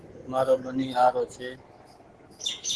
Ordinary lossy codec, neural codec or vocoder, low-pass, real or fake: Opus, 16 kbps; autoencoder, 48 kHz, 128 numbers a frame, DAC-VAE, trained on Japanese speech; 10.8 kHz; fake